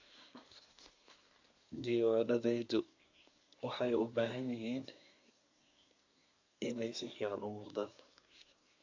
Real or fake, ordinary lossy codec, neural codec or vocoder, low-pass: fake; MP3, 64 kbps; codec, 24 kHz, 1 kbps, SNAC; 7.2 kHz